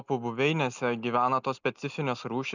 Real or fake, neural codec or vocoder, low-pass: real; none; 7.2 kHz